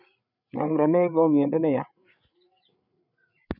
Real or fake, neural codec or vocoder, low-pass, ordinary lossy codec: fake; codec, 16 kHz, 8 kbps, FreqCodec, larger model; 5.4 kHz; none